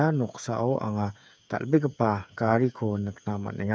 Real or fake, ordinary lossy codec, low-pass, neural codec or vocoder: fake; none; none; codec, 16 kHz, 8 kbps, FreqCodec, smaller model